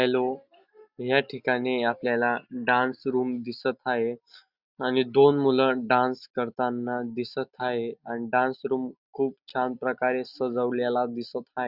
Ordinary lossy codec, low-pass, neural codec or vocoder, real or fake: Opus, 64 kbps; 5.4 kHz; none; real